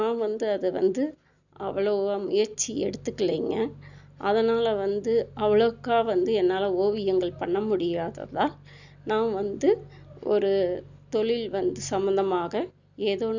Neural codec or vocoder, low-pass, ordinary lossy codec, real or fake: none; 7.2 kHz; none; real